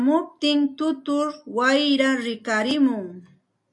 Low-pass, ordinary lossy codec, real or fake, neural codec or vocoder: 10.8 kHz; AAC, 64 kbps; real; none